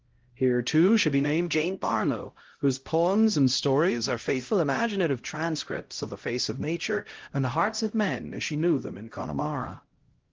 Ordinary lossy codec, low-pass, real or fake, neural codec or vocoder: Opus, 16 kbps; 7.2 kHz; fake; codec, 16 kHz, 0.5 kbps, X-Codec, HuBERT features, trained on LibriSpeech